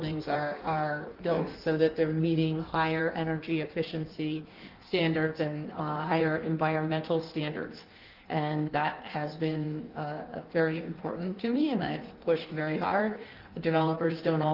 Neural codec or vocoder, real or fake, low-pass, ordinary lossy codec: codec, 16 kHz in and 24 kHz out, 1.1 kbps, FireRedTTS-2 codec; fake; 5.4 kHz; Opus, 32 kbps